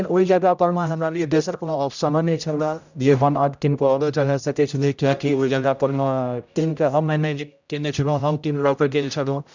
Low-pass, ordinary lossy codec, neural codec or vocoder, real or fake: 7.2 kHz; none; codec, 16 kHz, 0.5 kbps, X-Codec, HuBERT features, trained on general audio; fake